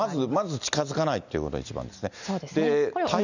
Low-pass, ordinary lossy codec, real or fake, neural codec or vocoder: 7.2 kHz; none; real; none